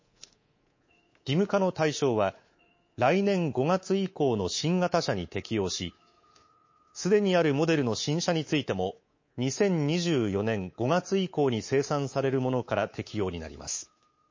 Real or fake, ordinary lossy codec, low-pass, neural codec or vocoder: fake; MP3, 32 kbps; 7.2 kHz; codec, 24 kHz, 3.1 kbps, DualCodec